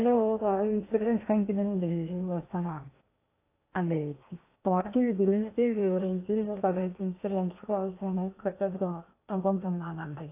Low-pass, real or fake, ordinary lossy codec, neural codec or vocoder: 3.6 kHz; fake; AAC, 24 kbps; codec, 16 kHz in and 24 kHz out, 0.8 kbps, FocalCodec, streaming, 65536 codes